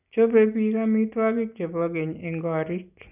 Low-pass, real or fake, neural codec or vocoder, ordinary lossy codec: 3.6 kHz; real; none; none